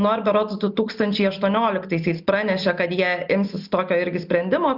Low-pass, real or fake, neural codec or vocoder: 5.4 kHz; real; none